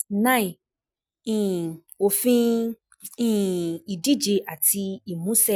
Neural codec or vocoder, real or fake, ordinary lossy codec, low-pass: none; real; none; none